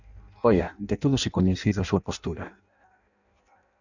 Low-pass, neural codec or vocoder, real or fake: 7.2 kHz; codec, 16 kHz in and 24 kHz out, 0.6 kbps, FireRedTTS-2 codec; fake